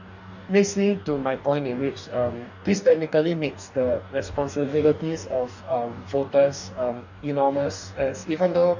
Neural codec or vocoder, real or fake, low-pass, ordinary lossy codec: codec, 44.1 kHz, 2.6 kbps, DAC; fake; 7.2 kHz; none